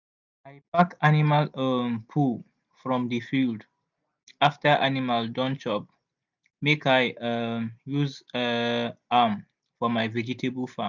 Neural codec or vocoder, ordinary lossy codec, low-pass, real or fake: none; none; 7.2 kHz; real